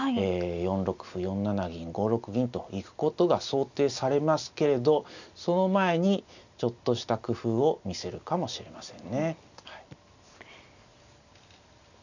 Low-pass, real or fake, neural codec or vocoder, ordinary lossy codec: 7.2 kHz; real; none; none